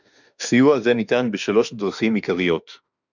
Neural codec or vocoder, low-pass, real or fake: autoencoder, 48 kHz, 32 numbers a frame, DAC-VAE, trained on Japanese speech; 7.2 kHz; fake